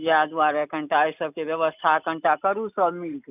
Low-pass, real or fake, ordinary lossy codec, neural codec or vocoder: 3.6 kHz; real; none; none